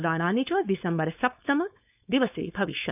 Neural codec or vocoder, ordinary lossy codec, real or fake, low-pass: codec, 16 kHz, 4.8 kbps, FACodec; none; fake; 3.6 kHz